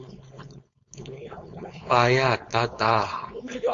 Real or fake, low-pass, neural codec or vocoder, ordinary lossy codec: fake; 7.2 kHz; codec, 16 kHz, 4.8 kbps, FACodec; MP3, 48 kbps